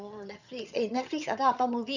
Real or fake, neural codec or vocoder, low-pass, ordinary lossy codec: fake; vocoder, 22.05 kHz, 80 mel bands, HiFi-GAN; 7.2 kHz; none